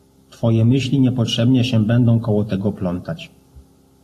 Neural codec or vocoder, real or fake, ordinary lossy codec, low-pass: none; real; AAC, 48 kbps; 14.4 kHz